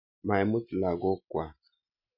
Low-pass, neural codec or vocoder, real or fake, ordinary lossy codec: 5.4 kHz; none; real; none